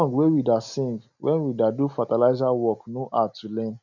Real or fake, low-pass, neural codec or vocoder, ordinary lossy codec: real; 7.2 kHz; none; none